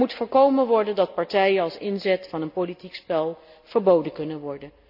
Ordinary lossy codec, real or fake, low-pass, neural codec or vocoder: AAC, 48 kbps; real; 5.4 kHz; none